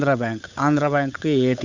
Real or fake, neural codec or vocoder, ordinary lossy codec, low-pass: fake; codec, 16 kHz, 8 kbps, FunCodec, trained on Chinese and English, 25 frames a second; none; 7.2 kHz